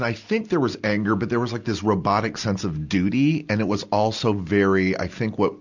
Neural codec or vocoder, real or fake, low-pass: none; real; 7.2 kHz